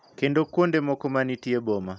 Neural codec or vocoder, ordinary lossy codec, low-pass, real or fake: none; none; none; real